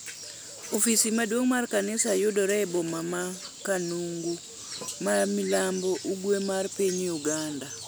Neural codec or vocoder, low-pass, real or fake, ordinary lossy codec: none; none; real; none